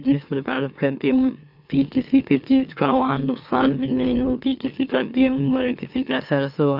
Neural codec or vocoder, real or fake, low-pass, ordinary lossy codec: autoencoder, 44.1 kHz, a latent of 192 numbers a frame, MeloTTS; fake; 5.4 kHz; none